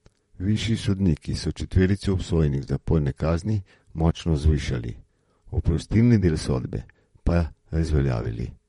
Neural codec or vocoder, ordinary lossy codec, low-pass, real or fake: vocoder, 44.1 kHz, 128 mel bands, Pupu-Vocoder; MP3, 48 kbps; 19.8 kHz; fake